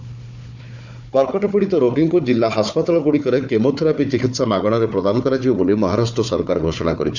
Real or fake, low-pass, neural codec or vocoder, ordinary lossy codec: fake; 7.2 kHz; codec, 16 kHz, 4 kbps, FunCodec, trained on Chinese and English, 50 frames a second; none